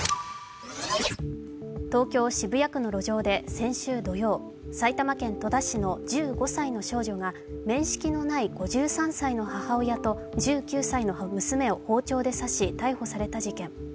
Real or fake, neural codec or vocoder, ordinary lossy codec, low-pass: real; none; none; none